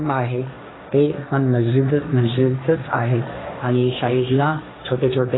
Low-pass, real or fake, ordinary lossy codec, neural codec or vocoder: 7.2 kHz; fake; AAC, 16 kbps; codec, 16 kHz, 1.1 kbps, Voila-Tokenizer